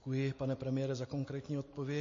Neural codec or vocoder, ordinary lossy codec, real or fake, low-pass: none; MP3, 32 kbps; real; 7.2 kHz